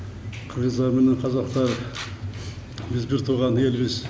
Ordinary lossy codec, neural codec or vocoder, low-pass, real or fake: none; none; none; real